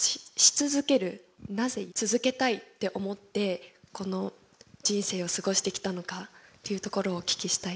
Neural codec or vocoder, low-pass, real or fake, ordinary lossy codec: none; none; real; none